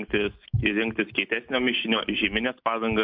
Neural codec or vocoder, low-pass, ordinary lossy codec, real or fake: none; 5.4 kHz; MP3, 48 kbps; real